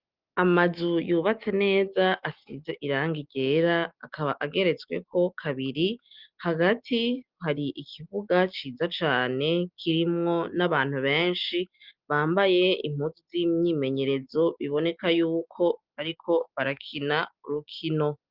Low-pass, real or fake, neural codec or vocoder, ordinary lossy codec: 5.4 kHz; real; none; Opus, 32 kbps